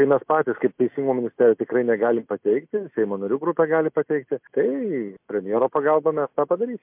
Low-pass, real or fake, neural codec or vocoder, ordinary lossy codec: 3.6 kHz; real; none; MP3, 32 kbps